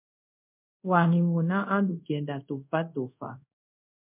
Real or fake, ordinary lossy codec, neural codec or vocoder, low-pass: fake; MP3, 32 kbps; codec, 24 kHz, 0.5 kbps, DualCodec; 3.6 kHz